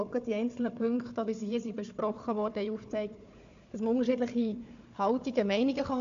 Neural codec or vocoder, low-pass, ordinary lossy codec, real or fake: codec, 16 kHz, 4 kbps, FunCodec, trained on Chinese and English, 50 frames a second; 7.2 kHz; AAC, 64 kbps; fake